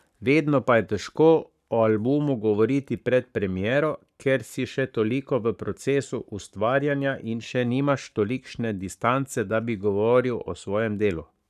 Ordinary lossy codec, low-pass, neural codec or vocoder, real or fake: none; 14.4 kHz; codec, 44.1 kHz, 7.8 kbps, Pupu-Codec; fake